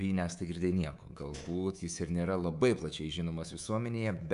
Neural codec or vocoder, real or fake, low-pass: codec, 24 kHz, 3.1 kbps, DualCodec; fake; 10.8 kHz